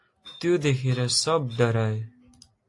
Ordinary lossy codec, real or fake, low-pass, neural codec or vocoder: AAC, 48 kbps; real; 10.8 kHz; none